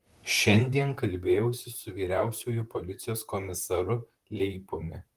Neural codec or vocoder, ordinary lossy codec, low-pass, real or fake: vocoder, 44.1 kHz, 128 mel bands, Pupu-Vocoder; Opus, 24 kbps; 14.4 kHz; fake